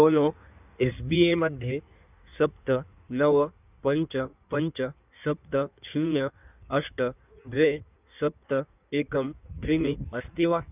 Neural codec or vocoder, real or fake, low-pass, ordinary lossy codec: codec, 16 kHz in and 24 kHz out, 1.1 kbps, FireRedTTS-2 codec; fake; 3.6 kHz; none